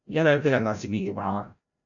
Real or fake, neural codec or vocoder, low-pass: fake; codec, 16 kHz, 0.5 kbps, FreqCodec, larger model; 7.2 kHz